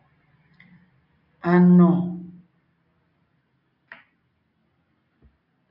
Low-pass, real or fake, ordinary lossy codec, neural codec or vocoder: 5.4 kHz; real; AAC, 48 kbps; none